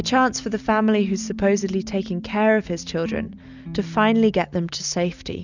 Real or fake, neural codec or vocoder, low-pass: real; none; 7.2 kHz